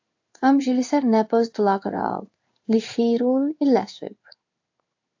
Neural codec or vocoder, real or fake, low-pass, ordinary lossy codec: codec, 16 kHz in and 24 kHz out, 1 kbps, XY-Tokenizer; fake; 7.2 kHz; AAC, 48 kbps